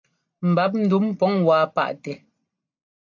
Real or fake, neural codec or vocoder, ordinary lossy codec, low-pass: real; none; AAC, 48 kbps; 7.2 kHz